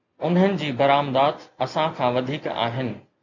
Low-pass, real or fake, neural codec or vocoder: 7.2 kHz; real; none